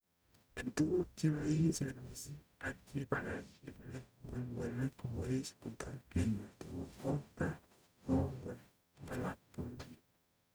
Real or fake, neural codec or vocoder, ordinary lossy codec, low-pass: fake; codec, 44.1 kHz, 0.9 kbps, DAC; none; none